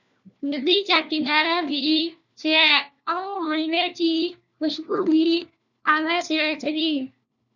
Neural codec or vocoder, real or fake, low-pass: codec, 16 kHz, 1 kbps, FunCodec, trained on LibriTTS, 50 frames a second; fake; 7.2 kHz